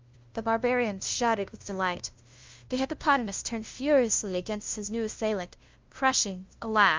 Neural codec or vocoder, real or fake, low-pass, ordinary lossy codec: codec, 16 kHz, 0.5 kbps, FunCodec, trained on LibriTTS, 25 frames a second; fake; 7.2 kHz; Opus, 32 kbps